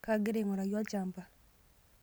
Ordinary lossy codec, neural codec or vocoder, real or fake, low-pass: none; none; real; none